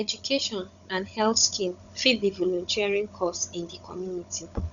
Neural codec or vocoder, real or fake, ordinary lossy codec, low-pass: codec, 16 kHz, 16 kbps, FunCodec, trained on Chinese and English, 50 frames a second; fake; none; 7.2 kHz